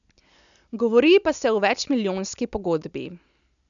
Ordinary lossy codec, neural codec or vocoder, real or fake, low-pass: none; none; real; 7.2 kHz